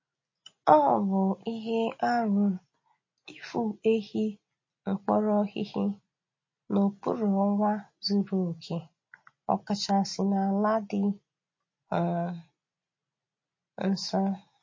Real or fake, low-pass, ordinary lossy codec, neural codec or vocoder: real; 7.2 kHz; MP3, 32 kbps; none